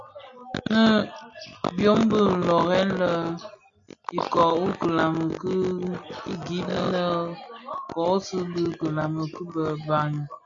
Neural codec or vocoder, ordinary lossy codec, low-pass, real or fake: none; MP3, 64 kbps; 7.2 kHz; real